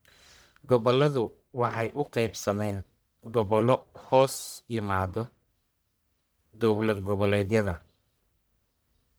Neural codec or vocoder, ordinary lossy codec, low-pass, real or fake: codec, 44.1 kHz, 1.7 kbps, Pupu-Codec; none; none; fake